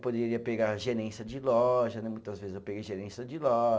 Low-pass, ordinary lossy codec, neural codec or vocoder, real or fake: none; none; none; real